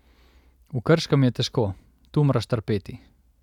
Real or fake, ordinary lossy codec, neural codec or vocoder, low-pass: real; none; none; 19.8 kHz